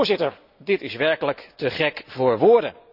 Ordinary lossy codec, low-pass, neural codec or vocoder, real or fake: none; 5.4 kHz; none; real